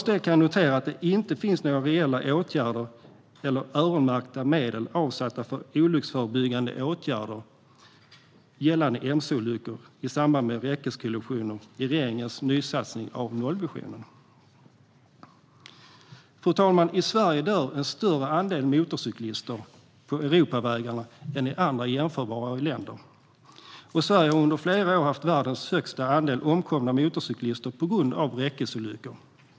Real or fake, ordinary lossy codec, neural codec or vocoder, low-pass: real; none; none; none